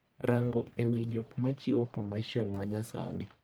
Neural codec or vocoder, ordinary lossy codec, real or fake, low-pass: codec, 44.1 kHz, 1.7 kbps, Pupu-Codec; none; fake; none